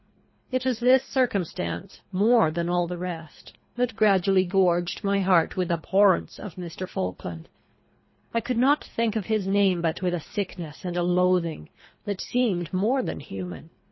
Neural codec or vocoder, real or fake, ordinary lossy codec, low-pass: codec, 24 kHz, 3 kbps, HILCodec; fake; MP3, 24 kbps; 7.2 kHz